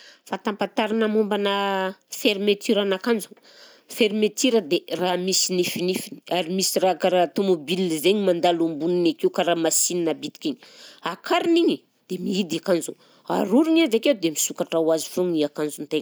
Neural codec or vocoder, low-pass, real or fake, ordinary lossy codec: none; none; real; none